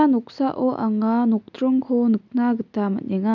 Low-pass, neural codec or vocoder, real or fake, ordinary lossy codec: 7.2 kHz; none; real; none